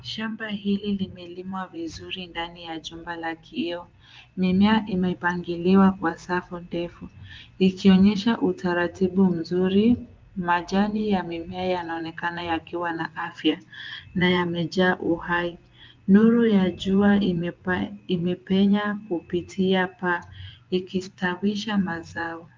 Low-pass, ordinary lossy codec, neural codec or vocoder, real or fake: 7.2 kHz; Opus, 24 kbps; vocoder, 24 kHz, 100 mel bands, Vocos; fake